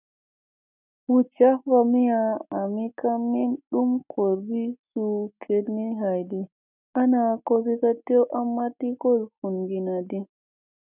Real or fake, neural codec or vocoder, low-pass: real; none; 3.6 kHz